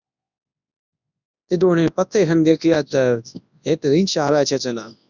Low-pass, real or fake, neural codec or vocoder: 7.2 kHz; fake; codec, 24 kHz, 0.9 kbps, WavTokenizer, large speech release